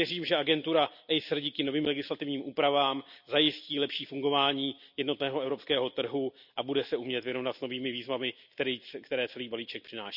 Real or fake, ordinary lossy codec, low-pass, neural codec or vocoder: real; none; 5.4 kHz; none